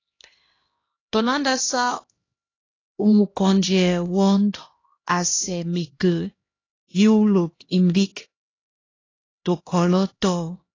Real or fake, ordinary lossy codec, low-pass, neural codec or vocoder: fake; AAC, 32 kbps; 7.2 kHz; codec, 16 kHz, 1 kbps, X-Codec, HuBERT features, trained on LibriSpeech